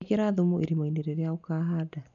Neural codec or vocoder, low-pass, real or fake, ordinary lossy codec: none; 7.2 kHz; real; none